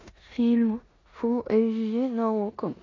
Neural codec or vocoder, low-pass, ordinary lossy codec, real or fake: codec, 16 kHz in and 24 kHz out, 0.9 kbps, LongCat-Audio-Codec, four codebook decoder; 7.2 kHz; AAC, 32 kbps; fake